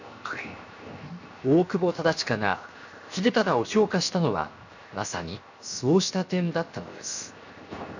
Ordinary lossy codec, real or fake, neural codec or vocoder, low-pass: none; fake; codec, 16 kHz, 0.7 kbps, FocalCodec; 7.2 kHz